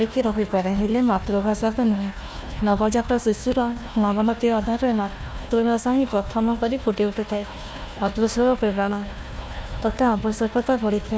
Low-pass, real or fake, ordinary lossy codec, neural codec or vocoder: none; fake; none; codec, 16 kHz, 1 kbps, FunCodec, trained on Chinese and English, 50 frames a second